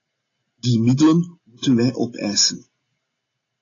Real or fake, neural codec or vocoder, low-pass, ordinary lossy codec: real; none; 7.2 kHz; AAC, 32 kbps